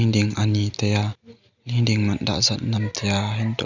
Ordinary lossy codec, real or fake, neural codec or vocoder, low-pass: none; real; none; 7.2 kHz